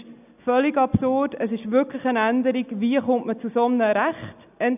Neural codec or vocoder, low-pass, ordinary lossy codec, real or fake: none; 3.6 kHz; none; real